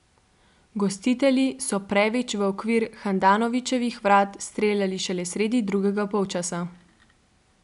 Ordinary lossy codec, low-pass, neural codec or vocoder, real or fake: none; 10.8 kHz; none; real